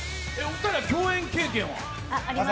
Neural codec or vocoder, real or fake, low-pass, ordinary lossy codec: none; real; none; none